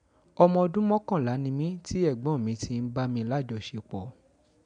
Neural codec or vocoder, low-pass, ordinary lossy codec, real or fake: none; 9.9 kHz; none; real